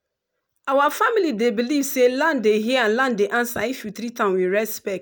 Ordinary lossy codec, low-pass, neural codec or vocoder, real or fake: none; none; none; real